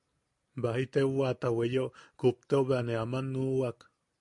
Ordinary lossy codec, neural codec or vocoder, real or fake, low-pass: MP3, 64 kbps; none; real; 10.8 kHz